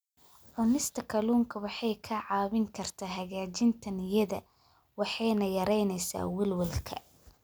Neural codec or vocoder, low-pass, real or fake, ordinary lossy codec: none; none; real; none